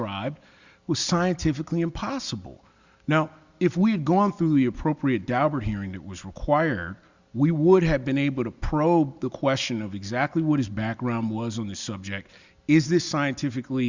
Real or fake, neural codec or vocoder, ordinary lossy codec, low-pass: real; none; Opus, 64 kbps; 7.2 kHz